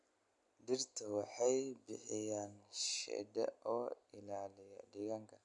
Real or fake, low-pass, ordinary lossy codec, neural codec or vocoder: real; 10.8 kHz; none; none